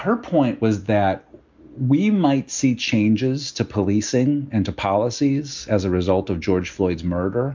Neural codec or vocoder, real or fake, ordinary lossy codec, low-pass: none; real; MP3, 64 kbps; 7.2 kHz